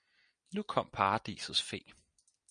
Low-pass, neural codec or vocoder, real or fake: 9.9 kHz; none; real